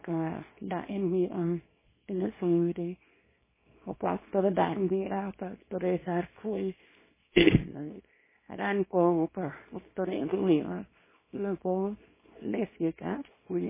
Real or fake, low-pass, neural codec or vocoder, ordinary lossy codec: fake; 3.6 kHz; codec, 24 kHz, 0.9 kbps, WavTokenizer, small release; MP3, 16 kbps